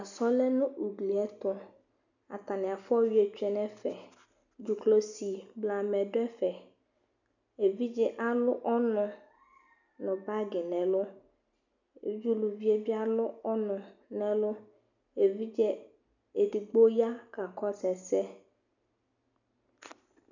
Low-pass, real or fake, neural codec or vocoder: 7.2 kHz; real; none